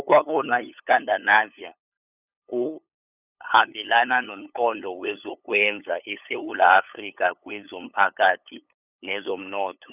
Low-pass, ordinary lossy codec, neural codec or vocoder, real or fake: 3.6 kHz; none; codec, 16 kHz, 8 kbps, FunCodec, trained on LibriTTS, 25 frames a second; fake